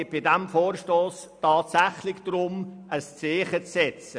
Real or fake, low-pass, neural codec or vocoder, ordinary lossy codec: real; 9.9 kHz; none; none